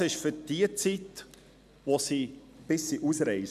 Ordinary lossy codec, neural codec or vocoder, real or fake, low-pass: none; none; real; 14.4 kHz